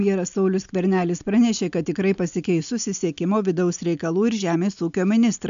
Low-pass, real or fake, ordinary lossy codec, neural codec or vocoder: 7.2 kHz; real; MP3, 64 kbps; none